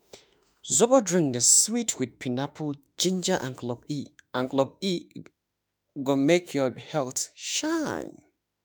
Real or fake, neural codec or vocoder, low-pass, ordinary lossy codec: fake; autoencoder, 48 kHz, 32 numbers a frame, DAC-VAE, trained on Japanese speech; none; none